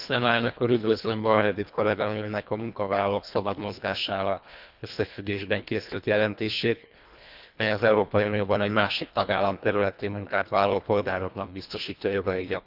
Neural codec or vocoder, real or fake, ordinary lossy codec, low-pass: codec, 24 kHz, 1.5 kbps, HILCodec; fake; none; 5.4 kHz